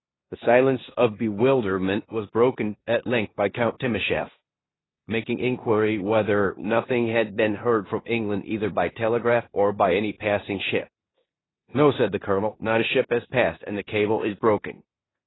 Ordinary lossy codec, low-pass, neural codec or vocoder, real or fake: AAC, 16 kbps; 7.2 kHz; codec, 16 kHz in and 24 kHz out, 0.9 kbps, LongCat-Audio-Codec, four codebook decoder; fake